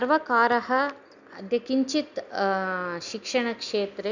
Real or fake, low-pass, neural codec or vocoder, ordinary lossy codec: real; 7.2 kHz; none; none